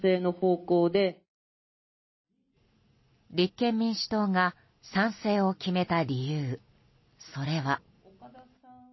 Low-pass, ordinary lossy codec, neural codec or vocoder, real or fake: 7.2 kHz; MP3, 24 kbps; none; real